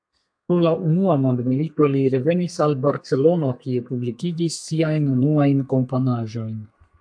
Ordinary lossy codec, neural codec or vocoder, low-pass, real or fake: AAC, 64 kbps; codec, 32 kHz, 1.9 kbps, SNAC; 9.9 kHz; fake